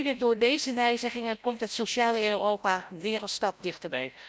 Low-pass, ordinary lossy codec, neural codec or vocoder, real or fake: none; none; codec, 16 kHz, 0.5 kbps, FreqCodec, larger model; fake